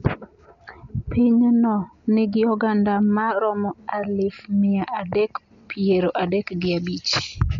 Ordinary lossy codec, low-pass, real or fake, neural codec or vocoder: MP3, 64 kbps; 7.2 kHz; real; none